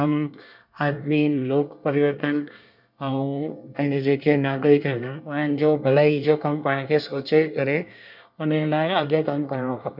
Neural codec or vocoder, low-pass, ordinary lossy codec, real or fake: codec, 24 kHz, 1 kbps, SNAC; 5.4 kHz; none; fake